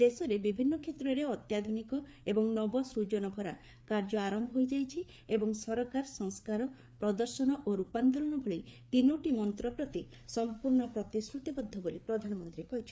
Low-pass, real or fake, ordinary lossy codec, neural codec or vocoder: none; fake; none; codec, 16 kHz, 4 kbps, FreqCodec, larger model